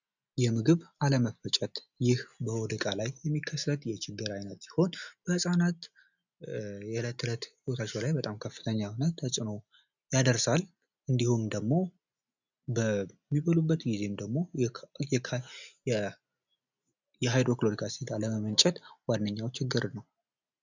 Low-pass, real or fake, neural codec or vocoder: 7.2 kHz; real; none